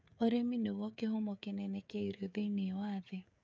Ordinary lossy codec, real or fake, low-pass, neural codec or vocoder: none; fake; none; codec, 16 kHz, 16 kbps, FreqCodec, smaller model